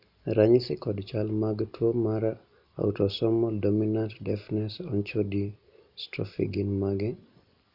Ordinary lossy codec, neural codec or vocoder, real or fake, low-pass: none; none; real; 5.4 kHz